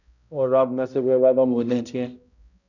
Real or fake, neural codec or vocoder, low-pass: fake; codec, 16 kHz, 0.5 kbps, X-Codec, HuBERT features, trained on balanced general audio; 7.2 kHz